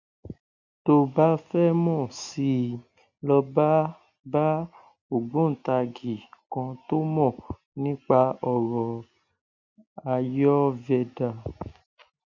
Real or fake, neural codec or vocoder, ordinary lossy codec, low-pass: real; none; none; 7.2 kHz